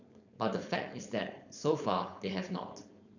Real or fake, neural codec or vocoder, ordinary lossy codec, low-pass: fake; codec, 16 kHz, 4.8 kbps, FACodec; none; 7.2 kHz